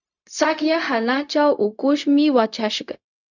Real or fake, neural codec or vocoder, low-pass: fake; codec, 16 kHz, 0.4 kbps, LongCat-Audio-Codec; 7.2 kHz